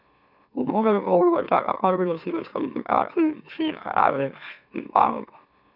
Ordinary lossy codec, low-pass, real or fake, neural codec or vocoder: none; 5.4 kHz; fake; autoencoder, 44.1 kHz, a latent of 192 numbers a frame, MeloTTS